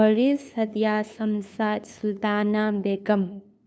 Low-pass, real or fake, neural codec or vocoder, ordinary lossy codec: none; fake; codec, 16 kHz, 2 kbps, FunCodec, trained on LibriTTS, 25 frames a second; none